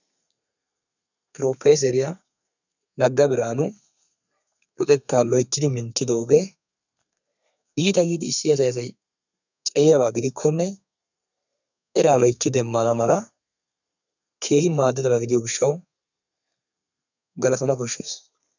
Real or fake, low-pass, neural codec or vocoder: fake; 7.2 kHz; codec, 32 kHz, 1.9 kbps, SNAC